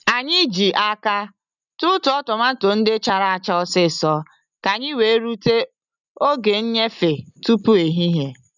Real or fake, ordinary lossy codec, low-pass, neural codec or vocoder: real; none; 7.2 kHz; none